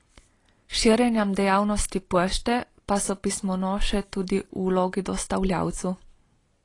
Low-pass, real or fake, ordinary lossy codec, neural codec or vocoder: 10.8 kHz; real; AAC, 32 kbps; none